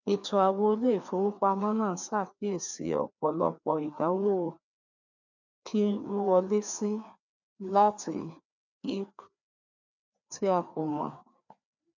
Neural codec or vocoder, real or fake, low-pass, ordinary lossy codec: codec, 16 kHz, 2 kbps, FreqCodec, larger model; fake; 7.2 kHz; none